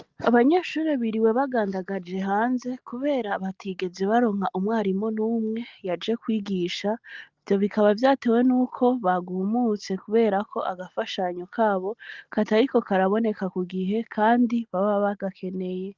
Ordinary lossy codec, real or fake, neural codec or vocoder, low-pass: Opus, 32 kbps; real; none; 7.2 kHz